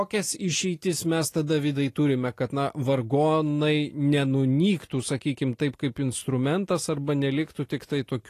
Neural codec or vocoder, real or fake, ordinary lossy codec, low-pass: none; real; AAC, 48 kbps; 14.4 kHz